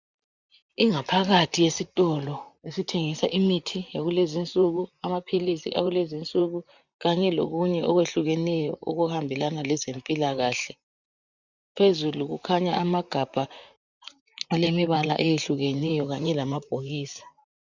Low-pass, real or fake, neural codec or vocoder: 7.2 kHz; fake; vocoder, 44.1 kHz, 128 mel bands, Pupu-Vocoder